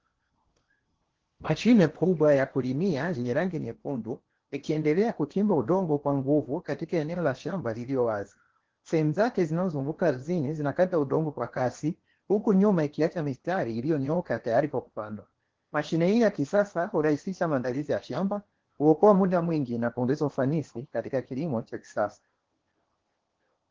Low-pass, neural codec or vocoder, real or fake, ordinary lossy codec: 7.2 kHz; codec, 16 kHz in and 24 kHz out, 0.8 kbps, FocalCodec, streaming, 65536 codes; fake; Opus, 16 kbps